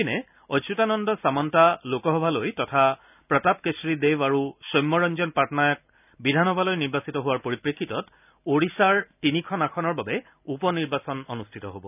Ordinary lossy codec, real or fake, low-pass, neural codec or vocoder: none; real; 3.6 kHz; none